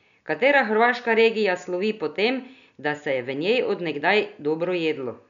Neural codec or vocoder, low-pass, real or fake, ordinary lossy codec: none; 7.2 kHz; real; none